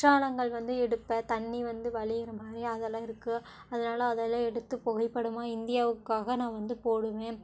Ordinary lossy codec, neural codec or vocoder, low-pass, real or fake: none; none; none; real